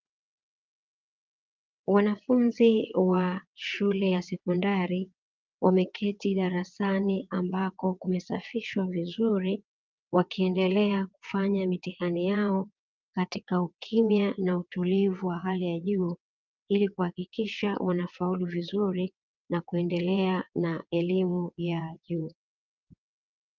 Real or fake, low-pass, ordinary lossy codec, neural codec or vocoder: fake; 7.2 kHz; Opus, 24 kbps; vocoder, 22.05 kHz, 80 mel bands, WaveNeXt